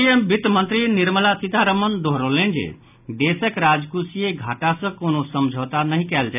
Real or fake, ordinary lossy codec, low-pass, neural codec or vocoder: real; none; 3.6 kHz; none